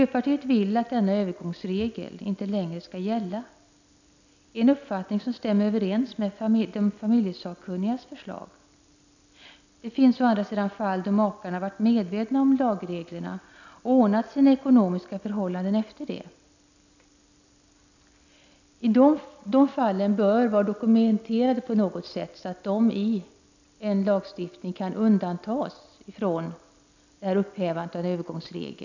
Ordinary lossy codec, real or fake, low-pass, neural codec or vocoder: none; real; 7.2 kHz; none